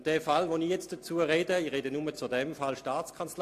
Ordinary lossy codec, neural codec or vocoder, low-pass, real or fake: AAC, 64 kbps; none; 14.4 kHz; real